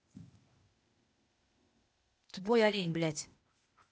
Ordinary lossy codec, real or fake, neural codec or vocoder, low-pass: none; fake; codec, 16 kHz, 0.8 kbps, ZipCodec; none